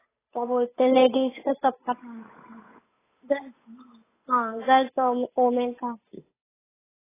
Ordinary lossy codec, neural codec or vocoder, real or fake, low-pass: AAC, 16 kbps; codec, 16 kHz, 8 kbps, FunCodec, trained on Chinese and English, 25 frames a second; fake; 3.6 kHz